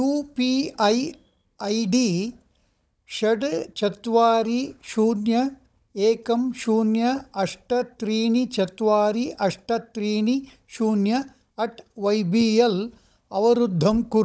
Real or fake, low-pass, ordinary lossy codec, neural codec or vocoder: fake; none; none; codec, 16 kHz, 16 kbps, FunCodec, trained on Chinese and English, 50 frames a second